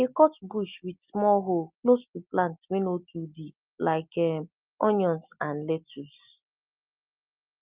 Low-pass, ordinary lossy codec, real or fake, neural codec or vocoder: 3.6 kHz; Opus, 24 kbps; real; none